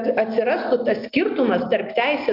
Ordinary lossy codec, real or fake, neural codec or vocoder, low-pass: MP3, 48 kbps; real; none; 5.4 kHz